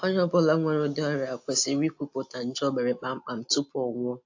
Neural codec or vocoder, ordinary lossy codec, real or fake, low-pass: none; AAC, 48 kbps; real; 7.2 kHz